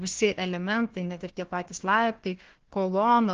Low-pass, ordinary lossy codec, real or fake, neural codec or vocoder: 7.2 kHz; Opus, 16 kbps; fake; codec, 16 kHz, 1 kbps, FunCodec, trained on Chinese and English, 50 frames a second